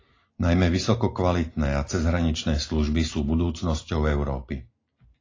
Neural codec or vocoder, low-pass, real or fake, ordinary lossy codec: none; 7.2 kHz; real; AAC, 32 kbps